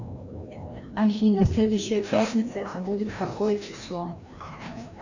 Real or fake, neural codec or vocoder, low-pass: fake; codec, 16 kHz, 1 kbps, FreqCodec, larger model; 7.2 kHz